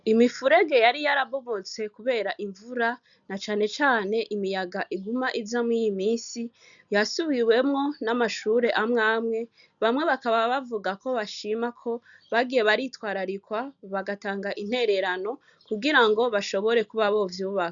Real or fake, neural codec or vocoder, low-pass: real; none; 7.2 kHz